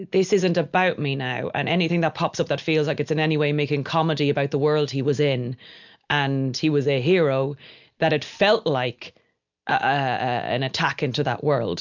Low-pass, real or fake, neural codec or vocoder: 7.2 kHz; real; none